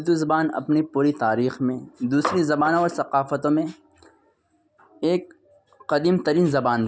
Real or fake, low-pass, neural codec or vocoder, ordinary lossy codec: real; none; none; none